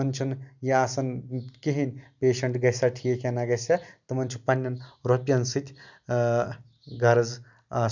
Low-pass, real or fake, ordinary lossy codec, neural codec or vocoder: 7.2 kHz; real; none; none